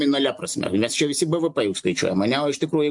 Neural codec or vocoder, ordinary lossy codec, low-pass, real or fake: none; MP3, 64 kbps; 10.8 kHz; real